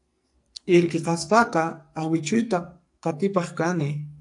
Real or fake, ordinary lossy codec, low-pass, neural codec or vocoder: fake; AAC, 64 kbps; 10.8 kHz; codec, 44.1 kHz, 2.6 kbps, SNAC